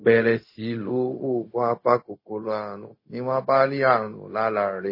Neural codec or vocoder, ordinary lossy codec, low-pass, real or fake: codec, 16 kHz, 0.4 kbps, LongCat-Audio-Codec; MP3, 24 kbps; 5.4 kHz; fake